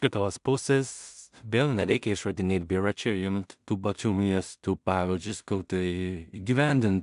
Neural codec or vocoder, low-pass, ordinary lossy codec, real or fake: codec, 16 kHz in and 24 kHz out, 0.4 kbps, LongCat-Audio-Codec, two codebook decoder; 10.8 kHz; MP3, 96 kbps; fake